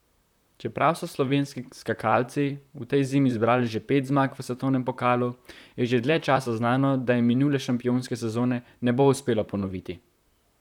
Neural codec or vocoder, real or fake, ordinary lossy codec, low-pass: vocoder, 44.1 kHz, 128 mel bands, Pupu-Vocoder; fake; none; 19.8 kHz